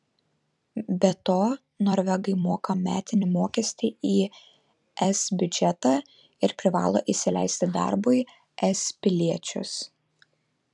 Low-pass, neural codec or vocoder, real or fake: 10.8 kHz; vocoder, 44.1 kHz, 128 mel bands every 256 samples, BigVGAN v2; fake